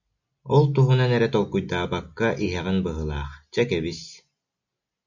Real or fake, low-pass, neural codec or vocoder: real; 7.2 kHz; none